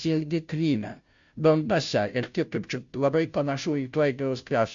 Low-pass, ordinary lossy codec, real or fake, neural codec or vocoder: 7.2 kHz; MP3, 64 kbps; fake; codec, 16 kHz, 0.5 kbps, FunCodec, trained on Chinese and English, 25 frames a second